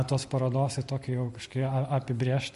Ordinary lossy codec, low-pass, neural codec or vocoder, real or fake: MP3, 48 kbps; 14.4 kHz; none; real